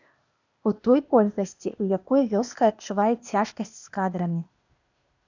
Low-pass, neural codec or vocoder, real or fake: 7.2 kHz; codec, 16 kHz, 0.8 kbps, ZipCodec; fake